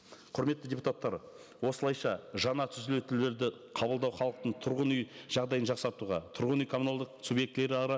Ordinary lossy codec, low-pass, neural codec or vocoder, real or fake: none; none; none; real